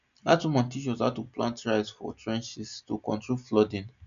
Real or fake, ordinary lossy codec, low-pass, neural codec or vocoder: real; none; 7.2 kHz; none